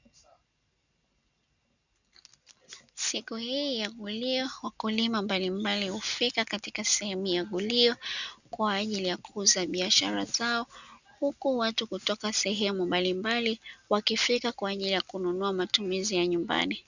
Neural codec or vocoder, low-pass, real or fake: none; 7.2 kHz; real